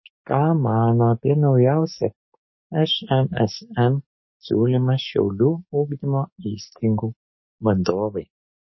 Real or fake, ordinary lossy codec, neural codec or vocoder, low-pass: fake; MP3, 24 kbps; codec, 44.1 kHz, 7.8 kbps, DAC; 7.2 kHz